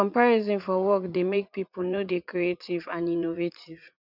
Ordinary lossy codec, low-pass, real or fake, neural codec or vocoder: none; 5.4 kHz; fake; vocoder, 44.1 kHz, 80 mel bands, Vocos